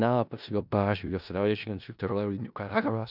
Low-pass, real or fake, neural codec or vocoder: 5.4 kHz; fake; codec, 16 kHz in and 24 kHz out, 0.4 kbps, LongCat-Audio-Codec, four codebook decoder